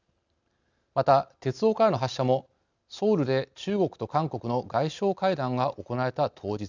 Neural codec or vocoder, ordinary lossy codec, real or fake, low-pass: none; none; real; 7.2 kHz